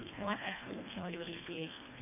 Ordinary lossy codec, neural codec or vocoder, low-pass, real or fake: none; codec, 24 kHz, 1.5 kbps, HILCodec; 3.6 kHz; fake